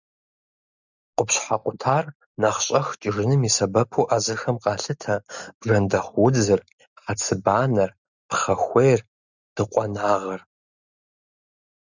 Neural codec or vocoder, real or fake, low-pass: none; real; 7.2 kHz